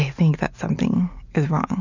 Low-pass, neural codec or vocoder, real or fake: 7.2 kHz; none; real